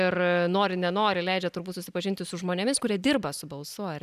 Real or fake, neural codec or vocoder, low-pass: real; none; 14.4 kHz